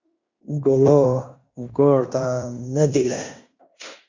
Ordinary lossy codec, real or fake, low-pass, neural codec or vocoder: Opus, 64 kbps; fake; 7.2 kHz; codec, 16 kHz in and 24 kHz out, 0.9 kbps, LongCat-Audio-Codec, fine tuned four codebook decoder